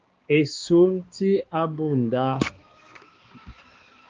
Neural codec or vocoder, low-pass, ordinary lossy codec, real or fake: codec, 16 kHz, 2 kbps, X-Codec, HuBERT features, trained on balanced general audio; 7.2 kHz; Opus, 24 kbps; fake